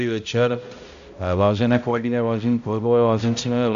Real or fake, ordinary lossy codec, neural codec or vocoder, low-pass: fake; AAC, 64 kbps; codec, 16 kHz, 0.5 kbps, X-Codec, HuBERT features, trained on balanced general audio; 7.2 kHz